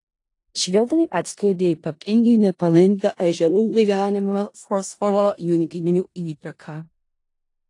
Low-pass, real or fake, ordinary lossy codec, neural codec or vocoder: 10.8 kHz; fake; AAC, 48 kbps; codec, 16 kHz in and 24 kHz out, 0.4 kbps, LongCat-Audio-Codec, four codebook decoder